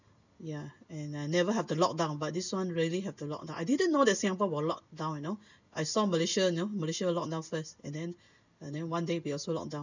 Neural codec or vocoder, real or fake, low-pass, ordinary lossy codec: none; real; 7.2 kHz; none